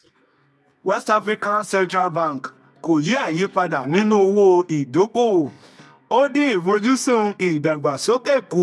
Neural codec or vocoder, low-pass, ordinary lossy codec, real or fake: codec, 24 kHz, 0.9 kbps, WavTokenizer, medium music audio release; none; none; fake